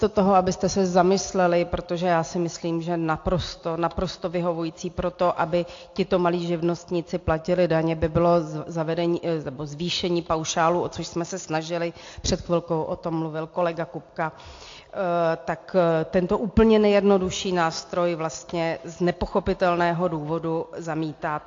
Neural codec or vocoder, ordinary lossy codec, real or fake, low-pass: none; AAC, 48 kbps; real; 7.2 kHz